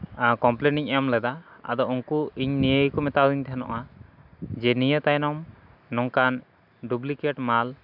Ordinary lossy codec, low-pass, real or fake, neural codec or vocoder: none; 5.4 kHz; real; none